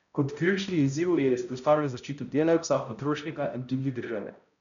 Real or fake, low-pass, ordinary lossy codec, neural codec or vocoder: fake; 7.2 kHz; none; codec, 16 kHz, 0.5 kbps, X-Codec, HuBERT features, trained on balanced general audio